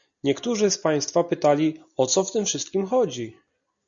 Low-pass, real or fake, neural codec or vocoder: 7.2 kHz; real; none